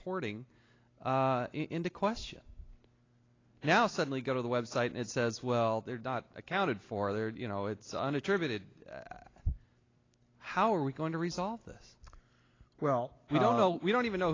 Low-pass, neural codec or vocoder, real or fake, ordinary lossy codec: 7.2 kHz; none; real; AAC, 32 kbps